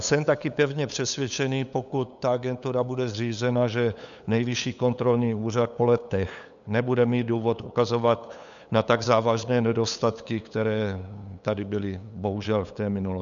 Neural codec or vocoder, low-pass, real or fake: codec, 16 kHz, 8 kbps, FunCodec, trained on LibriTTS, 25 frames a second; 7.2 kHz; fake